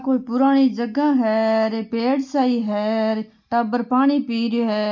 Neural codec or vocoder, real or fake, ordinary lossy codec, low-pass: none; real; none; 7.2 kHz